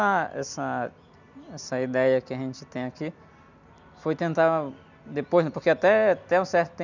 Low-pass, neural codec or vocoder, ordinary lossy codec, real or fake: 7.2 kHz; none; none; real